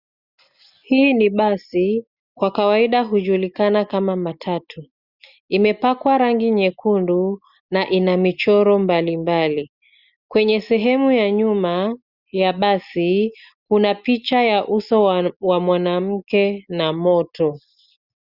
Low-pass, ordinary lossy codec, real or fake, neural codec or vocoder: 5.4 kHz; Opus, 64 kbps; real; none